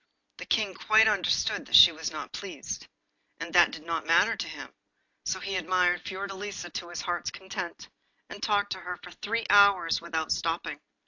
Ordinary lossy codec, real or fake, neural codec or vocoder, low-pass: AAC, 48 kbps; real; none; 7.2 kHz